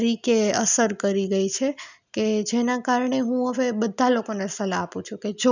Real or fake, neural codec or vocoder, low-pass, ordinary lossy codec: real; none; 7.2 kHz; none